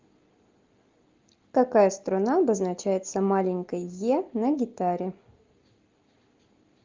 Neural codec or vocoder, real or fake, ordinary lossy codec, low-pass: none; real; Opus, 32 kbps; 7.2 kHz